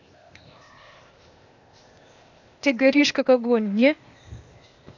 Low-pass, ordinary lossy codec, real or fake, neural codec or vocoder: 7.2 kHz; AAC, 48 kbps; fake; codec, 16 kHz, 0.8 kbps, ZipCodec